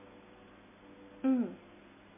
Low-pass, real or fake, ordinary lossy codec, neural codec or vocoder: 3.6 kHz; real; MP3, 16 kbps; none